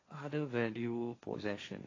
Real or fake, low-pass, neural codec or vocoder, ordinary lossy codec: fake; 7.2 kHz; codec, 16 kHz, 1.1 kbps, Voila-Tokenizer; AAC, 32 kbps